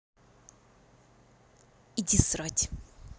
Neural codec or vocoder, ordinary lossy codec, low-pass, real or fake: none; none; none; real